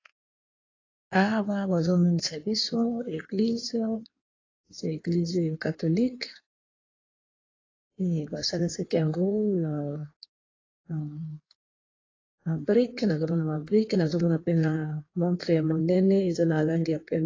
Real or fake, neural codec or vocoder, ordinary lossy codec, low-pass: fake; codec, 16 kHz in and 24 kHz out, 1.1 kbps, FireRedTTS-2 codec; AAC, 32 kbps; 7.2 kHz